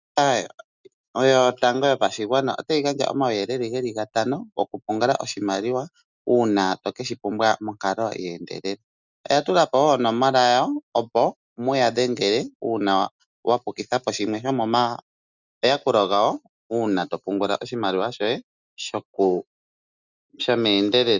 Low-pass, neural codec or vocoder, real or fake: 7.2 kHz; none; real